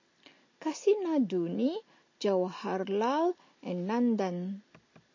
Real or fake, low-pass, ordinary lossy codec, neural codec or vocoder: real; 7.2 kHz; MP3, 32 kbps; none